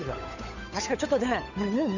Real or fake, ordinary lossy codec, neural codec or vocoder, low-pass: fake; none; codec, 16 kHz, 8 kbps, FunCodec, trained on Chinese and English, 25 frames a second; 7.2 kHz